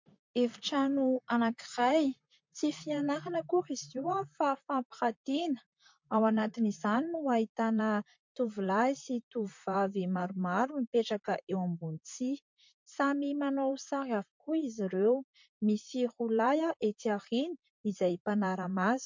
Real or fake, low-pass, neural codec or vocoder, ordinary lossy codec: fake; 7.2 kHz; vocoder, 44.1 kHz, 128 mel bands, Pupu-Vocoder; MP3, 48 kbps